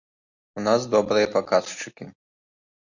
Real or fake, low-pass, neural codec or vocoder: real; 7.2 kHz; none